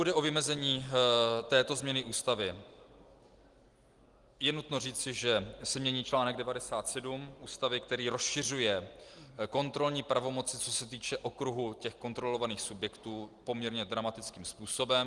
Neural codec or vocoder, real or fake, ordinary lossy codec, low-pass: none; real; Opus, 24 kbps; 10.8 kHz